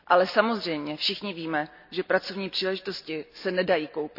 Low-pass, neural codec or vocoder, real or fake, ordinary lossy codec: 5.4 kHz; none; real; none